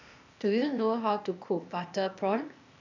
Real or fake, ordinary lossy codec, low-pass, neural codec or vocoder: fake; none; 7.2 kHz; codec, 16 kHz, 0.8 kbps, ZipCodec